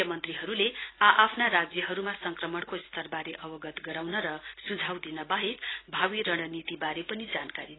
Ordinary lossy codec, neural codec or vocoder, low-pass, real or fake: AAC, 16 kbps; none; 7.2 kHz; real